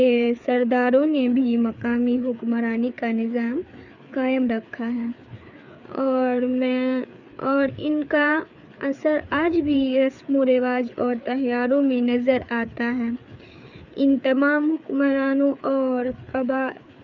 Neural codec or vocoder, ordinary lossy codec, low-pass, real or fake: codec, 16 kHz, 4 kbps, FreqCodec, larger model; none; 7.2 kHz; fake